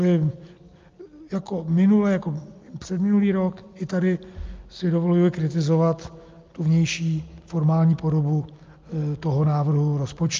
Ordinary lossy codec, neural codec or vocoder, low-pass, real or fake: Opus, 24 kbps; none; 7.2 kHz; real